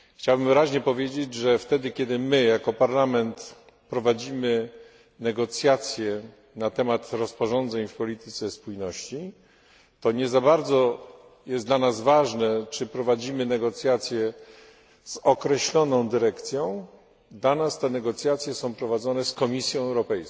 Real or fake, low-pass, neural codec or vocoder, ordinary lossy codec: real; none; none; none